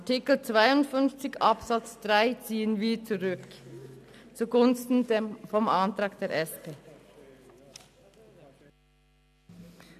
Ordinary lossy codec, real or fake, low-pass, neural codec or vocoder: none; real; 14.4 kHz; none